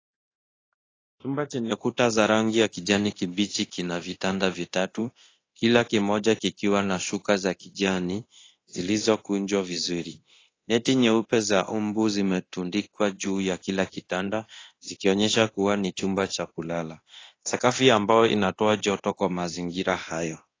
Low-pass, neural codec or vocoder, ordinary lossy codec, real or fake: 7.2 kHz; codec, 24 kHz, 0.9 kbps, DualCodec; AAC, 32 kbps; fake